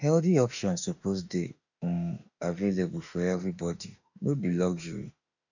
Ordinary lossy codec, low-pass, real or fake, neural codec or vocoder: none; 7.2 kHz; fake; autoencoder, 48 kHz, 32 numbers a frame, DAC-VAE, trained on Japanese speech